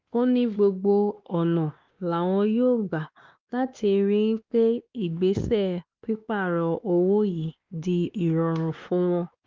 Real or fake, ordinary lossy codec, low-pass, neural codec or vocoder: fake; Opus, 32 kbps; 7.2 kHz; codec, 16 kHz, 2 kbps, X-Codec, WavLM features, trained on Multilingual LibriSpeech